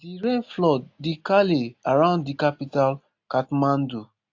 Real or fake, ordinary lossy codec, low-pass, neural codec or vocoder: real; AAC, 48 kbps; 7.2 kHz; none